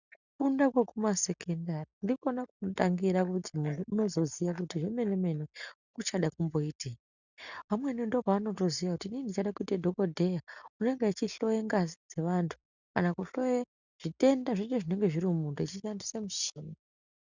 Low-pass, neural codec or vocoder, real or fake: 7.2 kHz; none; real